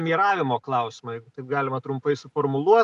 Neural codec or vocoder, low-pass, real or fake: none; 10.8 kHz; real